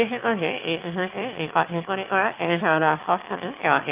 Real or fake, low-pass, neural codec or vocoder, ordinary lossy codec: fake; 3.6 kHz; autoencoder, 22.05 kHz, a latent of 192 numbers a frame, VITS, trained on one speaker; Opus, 24 kbps